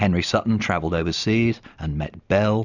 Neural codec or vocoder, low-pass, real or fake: none; 7.2 kHz; real